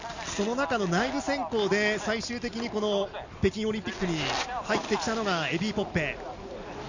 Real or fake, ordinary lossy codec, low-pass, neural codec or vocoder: real; none; 7.2 kHz; none